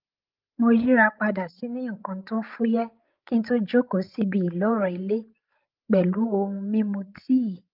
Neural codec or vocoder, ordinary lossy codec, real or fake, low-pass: codec, 16 kHz, 16 kbps, FreqCodec, larger model; Opus, 32 kbps; fake; 5.4 kHz